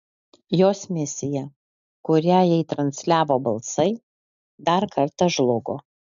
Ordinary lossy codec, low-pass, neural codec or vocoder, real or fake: MP3, 64 kbps; 7.2 kHz; none; real